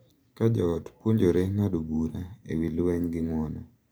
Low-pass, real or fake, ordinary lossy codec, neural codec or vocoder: none; fake; none; vocoder, 44.1 kHz, 128 mel bands every 512 samples, BigVGAN v2